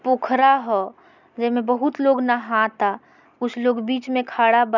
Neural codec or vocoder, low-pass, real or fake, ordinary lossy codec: none; 7.2 kHz; real; none